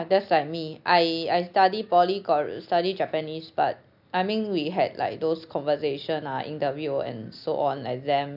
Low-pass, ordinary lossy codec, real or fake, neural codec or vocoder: 5.4 kHz; none; real; none